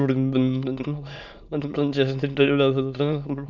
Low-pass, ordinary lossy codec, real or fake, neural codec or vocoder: 7.2 kHz; none; fake; autoencoder, 22.05 kHz, a latent of 192 numbers a frame, VITS, trained on many speakers